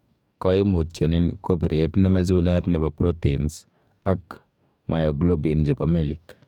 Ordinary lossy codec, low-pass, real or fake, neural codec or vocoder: none; 19.8 kHz; fake; codec, 44.1 kHz, 2.6 kbps, DAC